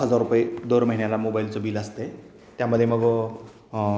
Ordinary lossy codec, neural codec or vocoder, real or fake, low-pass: none; none; real; none